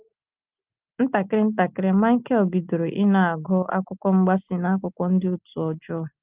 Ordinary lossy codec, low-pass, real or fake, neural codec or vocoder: Opus, 24 kbps; 3.6 kHz; real; none